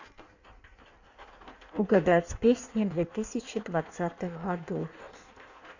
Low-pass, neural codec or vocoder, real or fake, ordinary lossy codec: 7.2 kHz; codec, 16 kHz in and 24 kHz out, 1.1 kbps, FireRedTTS-2 codec; fake; none